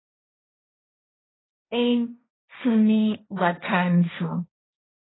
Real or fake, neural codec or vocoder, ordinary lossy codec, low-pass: fake; codec, 16 kHz, 1.1 kbps, Voila-Tokenizer; AAC, 16 kbps; 7.2 kHz